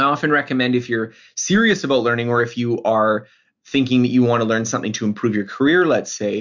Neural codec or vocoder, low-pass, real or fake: none; 7.2 kHz; real